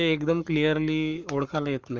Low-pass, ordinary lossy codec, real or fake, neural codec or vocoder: 7.2 kHz; Opus, 32 kbps; fake; codec, 44.1 kHz, 7.8 kbps, Pupu-Codec